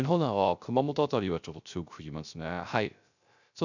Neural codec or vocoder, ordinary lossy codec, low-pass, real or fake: codec, 16 kHz, 0.3 kbps, FocalCodec; none; 7.2 kHz; fake